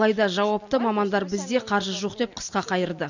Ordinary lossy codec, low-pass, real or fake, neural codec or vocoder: none; 7.2 kHz; real; none